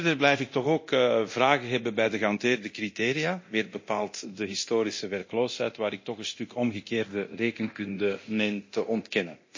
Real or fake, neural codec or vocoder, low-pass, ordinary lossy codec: fake; codec, 24 kHz, 0.9 kbps, DualCodec; 7.2 kHz; MP3, 32 kbps